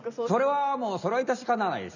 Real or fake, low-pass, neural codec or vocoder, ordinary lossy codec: real; 7.2 kHz; none; none